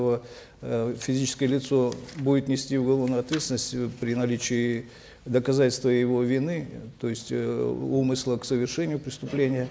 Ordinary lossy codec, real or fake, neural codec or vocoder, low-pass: none; real; none; none